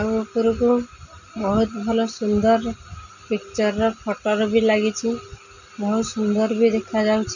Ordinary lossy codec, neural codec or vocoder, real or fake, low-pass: none; none; real; 7.2 kHz